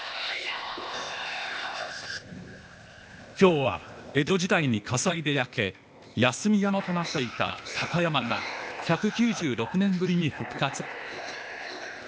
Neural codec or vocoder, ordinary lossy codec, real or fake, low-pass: codec, 16 kHz, 0.8 kbps, ZipCodec; none; fake; none